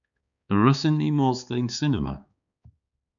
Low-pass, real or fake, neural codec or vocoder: 7.2 kHz; fake; codec, 16 kHz, 4 kbps, X-Codec, HuBERT features, trained on balanced general audio